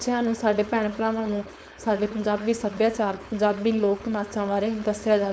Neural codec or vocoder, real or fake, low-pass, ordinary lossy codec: codec, 16 kHz, 4.8 kbps, FACodec; fake; none; none